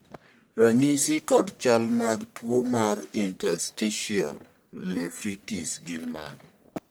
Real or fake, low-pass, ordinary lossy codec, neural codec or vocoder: fake; none; none; codec, 44.1 kHz, 1.7 kbps, Pupu-Codec